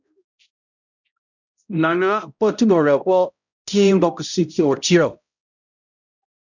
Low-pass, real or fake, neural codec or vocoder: 7.2 kHz; fake; codec, 16 kHz, 0.5 kbps, X-Codec, HuBERT features, trained on balanced general audio